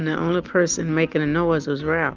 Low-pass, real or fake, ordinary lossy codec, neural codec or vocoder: 7.2 kHz; real; Opus, 32 kbps; none